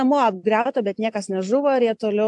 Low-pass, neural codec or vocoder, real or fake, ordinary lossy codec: 10.8 kHz; none; real; AAC, 64 kbps